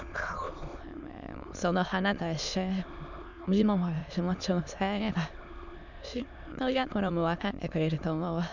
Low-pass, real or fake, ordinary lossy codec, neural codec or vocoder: 7.2 kHz; fake; none; autoencoder, 22.05 kHz, a latent of 192 numbers a frame, VITS, trained on many speakers